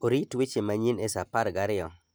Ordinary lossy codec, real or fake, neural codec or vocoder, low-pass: none; real; none; none